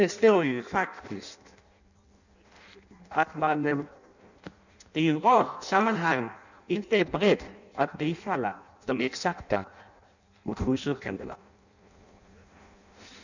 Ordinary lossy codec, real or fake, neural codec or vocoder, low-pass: none; fake; codec, 16 kHz in and 24 kHz out, 0.6 kbps, FireRedTTS-2 codec; 7.2 kHz